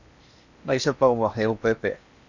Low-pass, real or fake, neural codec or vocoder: 7.2 kHz; fake; codec, 16 kHz in and 24 kHz out, 0.8 kbps, FocalCodec, streaming, 65536 codes